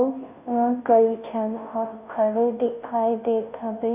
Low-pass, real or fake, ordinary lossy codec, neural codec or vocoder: 3.6 kHz; fake; none; codec, 16 kHz, 0.5 kbps, FunCodec, trained on Chinese and English, 25 frames a second